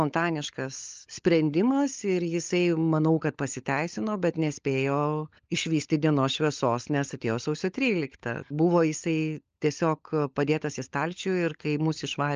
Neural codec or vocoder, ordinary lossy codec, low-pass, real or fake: codec, 16 kHz, 16 kbps, FunCodec, trained on LibriTTS, 50 frames a second; Opus, 24 kbps; 7.2 kHz; fake